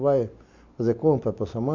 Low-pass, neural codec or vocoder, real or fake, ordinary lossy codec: 7.2 kHz; none; real; MP3, 64 kbps